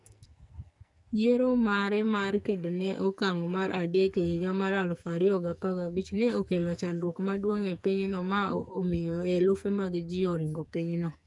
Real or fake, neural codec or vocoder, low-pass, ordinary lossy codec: fake; codec, 44.1 kHz, 2.6 kbps, SNAC; 10.8 kHz; none